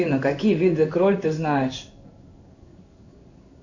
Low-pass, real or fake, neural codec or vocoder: 7.2 kHz; fake; codec, 16 kHz in and 24 kHz out, 1 kbps, XY-Tokenizer